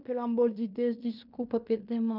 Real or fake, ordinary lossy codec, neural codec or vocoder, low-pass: fake; none; codec, 16 kHz in and 24 kHz out, 0.9 kbps, LongCat-Audio-Codec, fine tuned four codebook decoder; 5.4 kHz